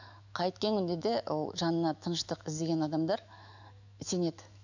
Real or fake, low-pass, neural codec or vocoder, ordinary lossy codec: fake; 7.2 kHz; autoencoder, 48 kHz, 128 numbers a frame, DAC-VAE, trained on Japanese speech; none